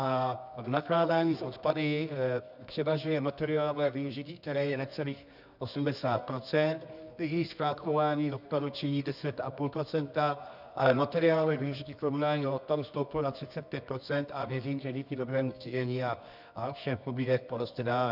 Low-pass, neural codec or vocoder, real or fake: 5.4 kHz; codec, 24 kHz, 0.9 kbps, WavTokenizer, medium music audio release; fake